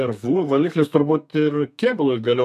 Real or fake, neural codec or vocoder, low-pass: fake; codec, 32 kHz, 1.9 kbps, SNAC; 14.4 kHz